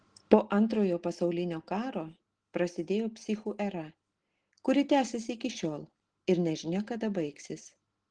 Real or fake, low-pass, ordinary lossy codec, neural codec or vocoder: real; 9.9 kHz; Opus, 16 kbps; none